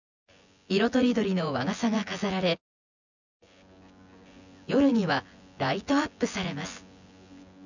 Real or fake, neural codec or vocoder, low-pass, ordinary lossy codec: fake; vocoder, 24 kHz, 100 mel bands, Vocos; 7.2 kHz; none